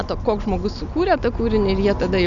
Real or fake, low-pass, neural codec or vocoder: real; 7.2 kHz; none